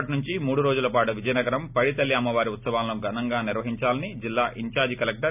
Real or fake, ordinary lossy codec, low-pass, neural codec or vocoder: real; none; 3.6 kHz; none